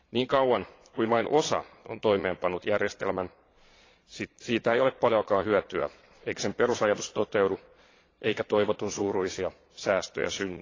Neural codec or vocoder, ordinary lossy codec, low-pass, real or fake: vocoder, 22.05 kHz, 80 mel bands, Vocos; AAC, 32 kbps; 7.2 kHz; fake